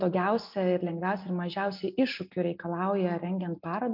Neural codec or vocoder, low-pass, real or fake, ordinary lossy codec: none; 5.4 kHz; real; MP3, 48 kbps